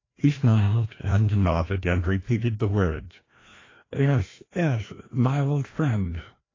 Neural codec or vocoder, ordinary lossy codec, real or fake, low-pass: codec, 16 kHz, 1 kbps, FreqCodec, larger model; AAC, 32 kbps; fake; 7.2 kHz